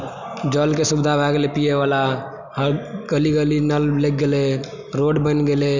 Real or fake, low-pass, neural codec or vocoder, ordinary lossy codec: real; 7.2 kHz; none; none